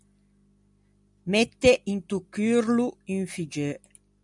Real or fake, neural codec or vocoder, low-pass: real; none; 10.8 kHz